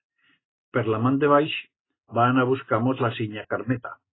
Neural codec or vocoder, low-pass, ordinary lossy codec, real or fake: none; 7.2 kHz; AAC, 16 kbps; real